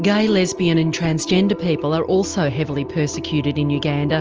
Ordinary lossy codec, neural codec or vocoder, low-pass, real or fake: Opus, 24 kbps; none; 7.2 kHz; real